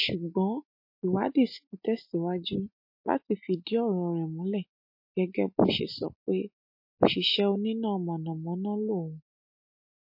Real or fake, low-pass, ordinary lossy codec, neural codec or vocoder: real; 5.4 kHz; MP3, 32 kbps; none